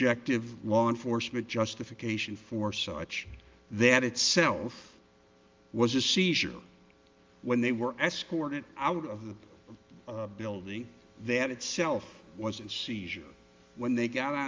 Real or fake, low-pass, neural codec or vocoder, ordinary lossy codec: real; 7.2 kHz; none; Opus, 32 kbps